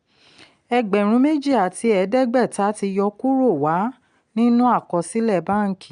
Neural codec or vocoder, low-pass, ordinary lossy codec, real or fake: none; 9.9 kHz; none; real